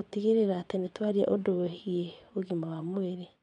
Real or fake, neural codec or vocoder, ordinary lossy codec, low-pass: fake; vocoder, 22.05 kHz, 80 mel bands, WaveNeXt; none; 9.9 kHz